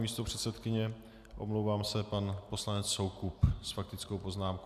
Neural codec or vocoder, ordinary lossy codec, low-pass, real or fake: none; AAC, 96 kbps; 14.4 kHz; real